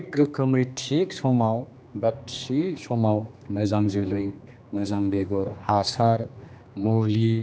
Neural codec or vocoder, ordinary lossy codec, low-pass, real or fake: codec, 16 kHz, 2 kbps, X-Codec, HuBERT features, trained on general audio; none; none; fake